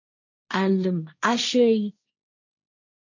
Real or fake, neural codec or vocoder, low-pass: fake; codec, 16 kHz, 1.1 kbps, Voila-Tokenizer; 7.2 kHz